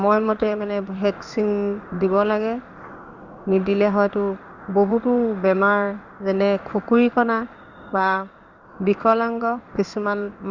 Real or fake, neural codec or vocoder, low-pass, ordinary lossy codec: fake; codec, 16 kHz in and 24 kHz out, 1 kbps, XY-Tokenizer; 7.2 kHz; Opus, 64 kbps